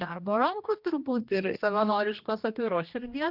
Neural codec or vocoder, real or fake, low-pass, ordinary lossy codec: codec, 16 kHz, 1 kbps, X-Codec, HuBERT features, trained on general audio; fake; 5.4 kHz; Opus, 24 kbps